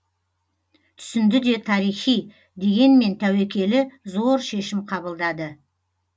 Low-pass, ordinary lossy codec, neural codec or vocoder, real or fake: none; none; none; real